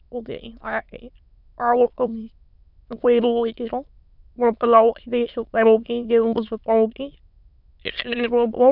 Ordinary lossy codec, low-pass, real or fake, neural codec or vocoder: none; 5.4 kHz; fake; autoencoder, 22.05 kHz, a latent of 192 numbers a frame, VITS, trained on many speakers